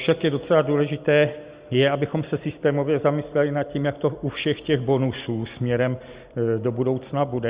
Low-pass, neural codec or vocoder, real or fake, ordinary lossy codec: 3.6 kHz; none; real; Opus, 64 kbps